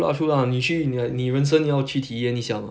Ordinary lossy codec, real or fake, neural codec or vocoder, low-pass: none; real; none; none